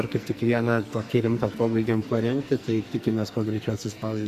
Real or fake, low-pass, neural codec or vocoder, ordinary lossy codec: fake; 14.4 kHz; codec, 44.1 kHz, 2.6 kbps, SNAC; Opus, 64 kbps